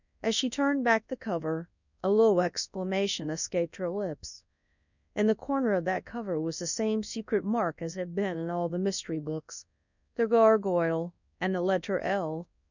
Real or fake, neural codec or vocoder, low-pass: fake; codec, 24 kHz, 0.9 kbps, WavTokenizer, large speech release; 7.2 kHz